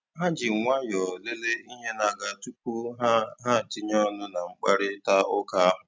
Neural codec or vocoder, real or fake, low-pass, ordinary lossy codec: none; real; none; none